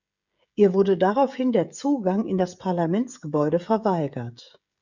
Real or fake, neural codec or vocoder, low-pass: fake; codec, 16 kHz, 16 kbps, FreqCodec, smaller model; 7.2 kHz